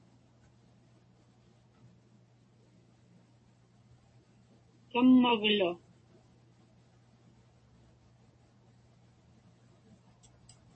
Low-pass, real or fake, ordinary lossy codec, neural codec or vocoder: 10.8 kHz; real; MP3, 32 kbps; none